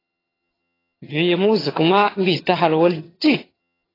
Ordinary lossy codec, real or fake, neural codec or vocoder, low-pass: AAC, 24 kbps; fake; vocoder, 22.05 kHz, 80 mel bands, HiFi-GAN; 5.4 kHz